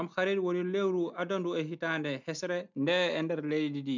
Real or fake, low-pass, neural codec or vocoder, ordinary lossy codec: real; 7.2 kHz; none; MP3, 64 kbps